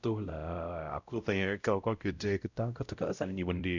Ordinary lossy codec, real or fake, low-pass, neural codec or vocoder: none; fake; 7.2 kHz; codec, 16 kHz, 0.5 kbps, X-Codec, WavLM features, trained on Multilingual LibriSpeech